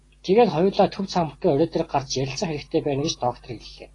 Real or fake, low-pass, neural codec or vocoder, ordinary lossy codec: real; 10.8 kHz; none; AAC, 32 kbps